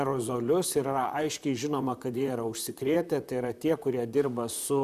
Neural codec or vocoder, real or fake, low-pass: vocoder, 44.1 kHz, 128 mel bands, Pupu-Vocoder; fake; 14.4 kHz